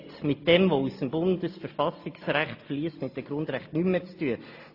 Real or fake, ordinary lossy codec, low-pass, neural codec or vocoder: real; AAC, 32 kbps; 5.4 kHz; none